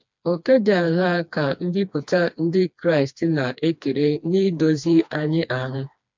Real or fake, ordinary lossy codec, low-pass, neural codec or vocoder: fake; MP3, 64 kbps; 7.2 kHz; codec, 16 kHz, 2 kbps, FreqCodec, smaller model